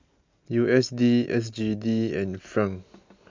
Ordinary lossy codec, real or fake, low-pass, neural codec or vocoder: MP3, 64 kbps; real; 7.2 kHz; none